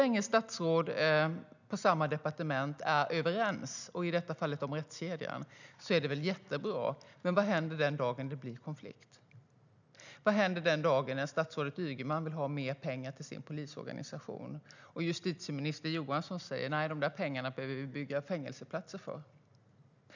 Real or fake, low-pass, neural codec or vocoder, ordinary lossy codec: real; 7.2 kHz; none; none